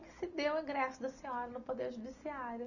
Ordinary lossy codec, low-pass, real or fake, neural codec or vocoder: none; 7.2 kHz; real; none